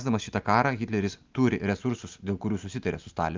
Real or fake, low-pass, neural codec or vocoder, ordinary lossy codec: real; 7.2 kHz; none; Opus, 24 kbps